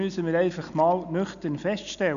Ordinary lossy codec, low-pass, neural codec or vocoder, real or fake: none; 7.2 kHz; none; real